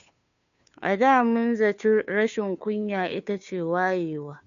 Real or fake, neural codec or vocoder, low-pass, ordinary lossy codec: fake; codec, 16 kHz, 2 kbps, FunCodec, trained on Chinese and English, 25 frames a second; 7.2 kHz; none